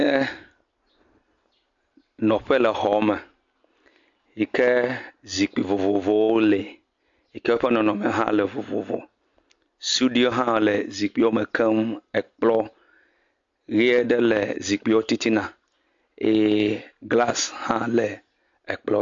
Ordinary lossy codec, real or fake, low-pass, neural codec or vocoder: AAC, 48 kbps; real; 7.2 kHz; none